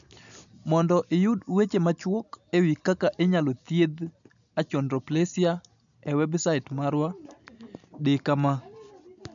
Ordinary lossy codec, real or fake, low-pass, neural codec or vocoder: none; real; 7.2 kHz; none